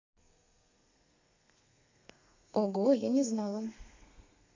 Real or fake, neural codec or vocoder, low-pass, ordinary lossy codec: fake; codec, 32 kHz, 1.9 kbps, SNAC; 7.2 kHz; MP3, 64 kbps